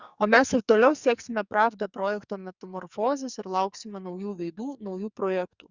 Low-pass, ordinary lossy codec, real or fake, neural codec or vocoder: 7.2 kHz; Opus, 64 kbps; fake; codec, 44.1 kHz, 2.6 kbps, SNAC